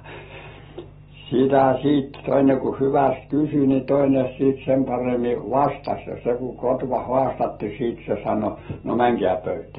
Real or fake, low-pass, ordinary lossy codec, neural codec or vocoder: real; 7.2 kHz; AAC, 16 kbps; none